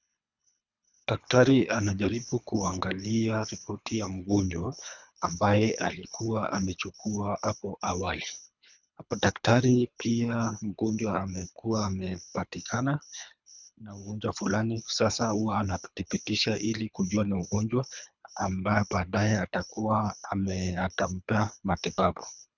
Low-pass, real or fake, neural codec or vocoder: 7.2 kHz; fake; codec, 24 kHz, 3 kbps, HILCodec